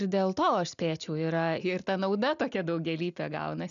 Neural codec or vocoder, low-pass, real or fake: none; 7.2 kHz; real